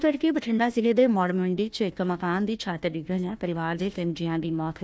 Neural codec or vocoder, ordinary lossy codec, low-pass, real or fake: codec, 16 kHz, 1 kbps, FunCodec, trained on Chinese and English, 50 frames a second; none; none; fake